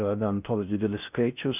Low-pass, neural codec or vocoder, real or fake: 3.6 kHz; codec, 16 kHz in and 24 kHz out, 0.6 kbps, FocalCodec, streaming, 4096 codes; fake